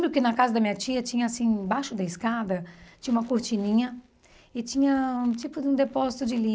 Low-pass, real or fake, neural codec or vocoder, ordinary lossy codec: none; real; none; none